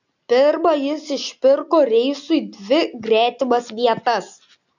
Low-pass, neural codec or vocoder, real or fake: 7.2 kHz; none; real